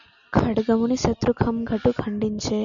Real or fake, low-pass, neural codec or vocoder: real; 7.2 kHz; none